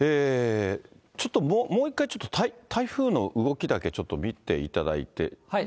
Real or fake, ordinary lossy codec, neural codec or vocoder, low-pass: real; none; none; none